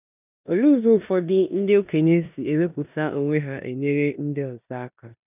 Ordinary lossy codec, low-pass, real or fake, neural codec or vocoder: none; 3.6 kHz; fake; codec, 16 kHz in and 24 kHz out, 0.9 kbps, LongCat-Audio-Codec, four codebook decoder